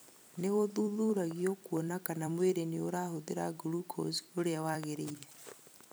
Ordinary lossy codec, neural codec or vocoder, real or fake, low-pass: none; vocoder, 44.1 kHz, 128 mel bands every 256 samples, BigVGAN v2; fake; none